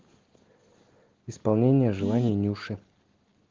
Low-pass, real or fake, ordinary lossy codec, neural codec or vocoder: 7.2 kHz; real; Opus, 16 kbps; none